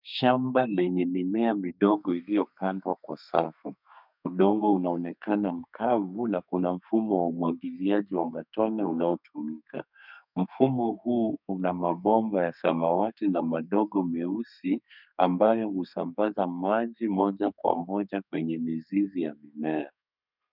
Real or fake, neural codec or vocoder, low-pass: fake; codec, 32 kHz, 1.9 kbps, SNAC; 5.4 kHz